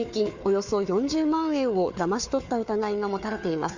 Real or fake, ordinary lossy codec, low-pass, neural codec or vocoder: fake; Opus, 64 kbps; 7.2 kHz; codec, 16 kHz, 4 kbps, FunCodec, trained on Chinese and English, 50 frames a second